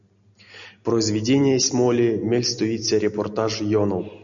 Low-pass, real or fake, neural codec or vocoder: 7.2 kHz; real; none